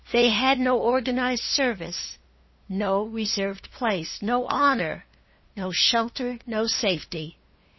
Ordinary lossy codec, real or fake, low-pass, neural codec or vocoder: MP3, 24 kbps; fake; 7.2 kHz; codec, 16 kHz, 2 kbps, FunCodec, trained on LibriTTS, 25 frames a second